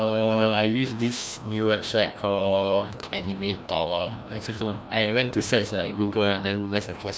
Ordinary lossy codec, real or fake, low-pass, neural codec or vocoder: none; fake; none; codec, 16 kHz, 1 kbps, FreqCodec, larger model